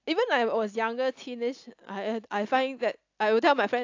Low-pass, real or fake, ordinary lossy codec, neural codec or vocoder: 7.2 kHz; real; AAC, 48 kbps; none